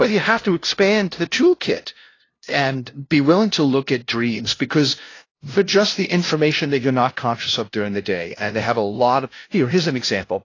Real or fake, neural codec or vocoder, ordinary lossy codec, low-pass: fake; codec, 16 kHz, 0.5 kbps, FunCodec, trained on LibriTTS, 25 frames a second; AAC, 32 kbps; 7.2 kHz